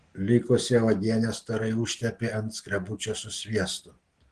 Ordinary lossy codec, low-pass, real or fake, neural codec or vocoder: Opus, 16 kbps; 9.9 kHz; real; none